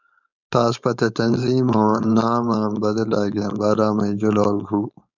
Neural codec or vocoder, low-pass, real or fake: codec, 16 kHz, 4.8 kbps, FACodec; 7.2 kHz; fake